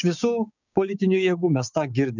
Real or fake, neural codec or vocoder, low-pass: fake; codec, 16 kHz, 6 kbps, DAC; 7.2 kHz